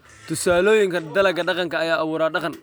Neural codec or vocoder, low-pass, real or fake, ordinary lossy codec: none; none; real; none